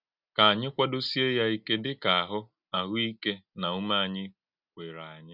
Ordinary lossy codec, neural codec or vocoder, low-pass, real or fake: none; none; 5.4 kHz; real